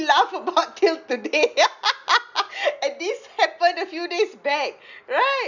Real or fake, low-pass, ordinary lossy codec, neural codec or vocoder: real; 7.2 kHz; none; none